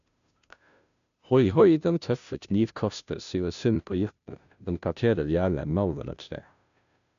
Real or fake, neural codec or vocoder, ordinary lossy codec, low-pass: fake; codec, 16 kHz, 0.5 kbps, FunCodec, trained on Chinese and English, 25 frames a second; none; 7.2 kHz